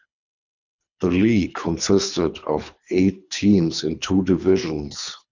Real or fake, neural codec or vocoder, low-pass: fake; codec, 24 kHz, 3 kbps, HILCodec; 7.2 kHz